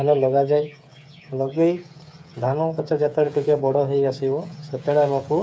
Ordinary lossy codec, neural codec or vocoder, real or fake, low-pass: none; codec, 16 kHz, 8 kbps, FreqCodec, smaller model; fake; none